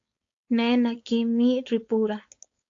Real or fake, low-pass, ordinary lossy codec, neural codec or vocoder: fake; 7.2 kHz; AAC, 48 kbps; codec, 16 kHz, 4.8 kbps, FACodec